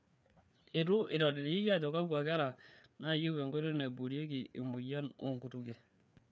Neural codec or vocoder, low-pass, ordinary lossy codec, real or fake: codec, 16 kHz, 4 kbps, FreqCodec, larger model; none; none; fake